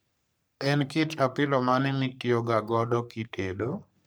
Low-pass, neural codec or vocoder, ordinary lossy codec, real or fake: none; codec, 44.1 kHz, 3.4 kbps, Pupu-Codec; none; fake